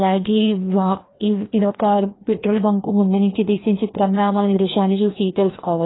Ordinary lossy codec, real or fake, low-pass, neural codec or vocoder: AAC, 16 kbps; fake; 7.2 kHz; codec, 16 kHz, 1 kbps, FreqCodec, larger model